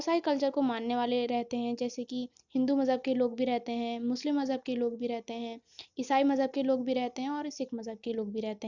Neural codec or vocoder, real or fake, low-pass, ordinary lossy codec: none; real; 7.2 kHz; Opus, 64 kbps